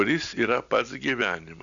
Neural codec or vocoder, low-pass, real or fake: none; 7.2 kHz; real